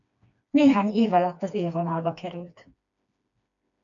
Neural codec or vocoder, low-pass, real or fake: codec, 16 kHz, 2 kbps, FreqCodec, smaller model; 7.2 kHz; fake